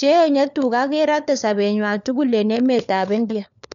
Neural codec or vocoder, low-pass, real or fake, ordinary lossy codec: codec, 16 kHz, 4 kbps, FunCodec, trained on LibriTTS, 50 frames a second; 7.2 kHz; fake; none